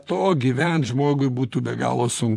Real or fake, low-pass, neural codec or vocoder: fake; 14.4 kHz; vocoder, 44.1 kHz, 128 mel bands, Pupu-Vocoder